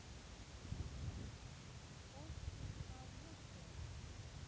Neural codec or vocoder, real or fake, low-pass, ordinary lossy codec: none; real; none; none